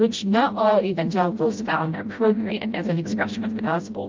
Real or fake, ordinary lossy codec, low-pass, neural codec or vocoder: fake; Opus, 24 kbps; 7.2 kHz; codec, 16 kHz, 0.5 kbps, FreqCodec, smaller model